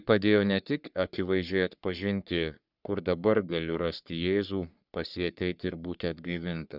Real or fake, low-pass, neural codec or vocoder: fake; 5.4 kHz; codec, 44.1 kHz, 3.4 kbps, Pupu-Codec